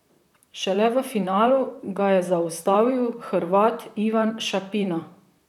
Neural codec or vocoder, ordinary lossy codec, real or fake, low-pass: vocoder, 44.1 kHz, 128 mel bands, Pupu-Vocoder; none; fake; 19.8 kHz